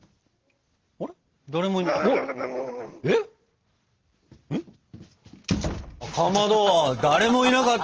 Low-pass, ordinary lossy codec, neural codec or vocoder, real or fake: 7.2 kHz; Opus, 16 kbps; none; real